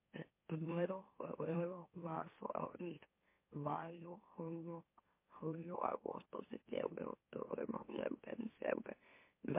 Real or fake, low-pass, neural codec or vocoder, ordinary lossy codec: fake; 3.6 kHz; autoencoder, 44.1 kHz, a latent of 192 numbers a frame, MeloTTS; MP3, 24 kbps